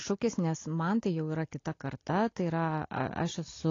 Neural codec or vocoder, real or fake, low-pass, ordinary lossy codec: none; real; 7.2 kHz; AAC, 32 kbps